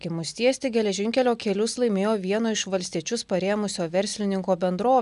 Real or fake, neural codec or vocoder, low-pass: real; none; 10.8 kHz